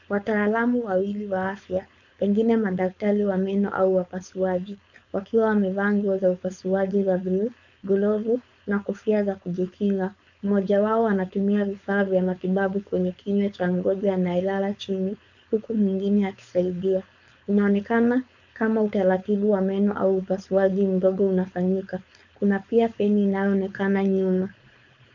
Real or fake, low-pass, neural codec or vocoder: fake; 7.2 kHz; codec, 16 kHz, 4.8 kbps, FACodec